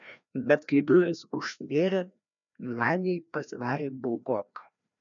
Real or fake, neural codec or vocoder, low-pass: fake; codec, 16 kHz, 1 kbps, FreqCodec, larger model; 7.2 kHz